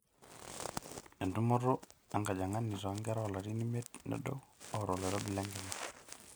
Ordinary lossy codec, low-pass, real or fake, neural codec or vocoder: none; none; real; none